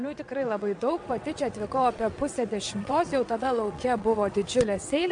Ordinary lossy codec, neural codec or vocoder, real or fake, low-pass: MP3, 64 kbps; vocoder, 22.05 kHz, 80 mel bands, WaveNeXt; fake; 9.9 kHz